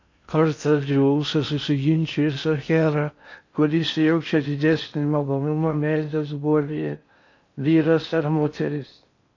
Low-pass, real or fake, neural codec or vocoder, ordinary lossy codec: 7.2 kHz; fake; codec, 16 kHz in and 24 kHz out, 0.6 kbps, FocalCodec, streaming, 4096 codes; AAC, 48 kbps